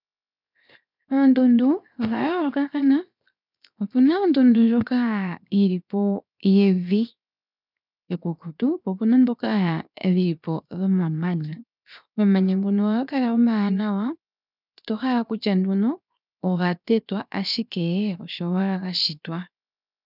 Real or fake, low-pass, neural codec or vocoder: fake; 5.4 kHz; codec, 16 kHz, 0.7 kbps, FocalCodec